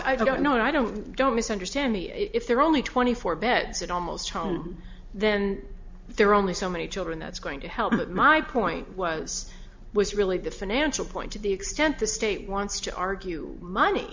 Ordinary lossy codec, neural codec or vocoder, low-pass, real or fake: MP3, 64 kbps; none; 7.2 kHz; real